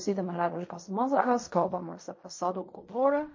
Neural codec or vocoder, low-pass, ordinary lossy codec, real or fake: codec, 16 kHz in and 24 kHz out, 0.4 kbps, LongCat-Audio-Codec, fine tuned four codebook decoder; 7.2 kHz; MP3, 32 kbps; fake